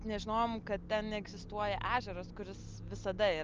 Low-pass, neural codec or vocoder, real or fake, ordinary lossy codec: 7.2 kHz; none; real; Opus, 24 kbps